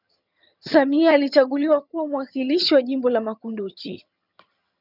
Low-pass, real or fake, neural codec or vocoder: 5.4 kHz; fake; vocoder, 22.05 kHz, 80 mel bands, HiFi-GAN